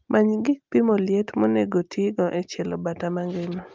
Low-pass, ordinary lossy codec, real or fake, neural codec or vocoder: 7.2 kHz; Opus, 24 kbps; real; none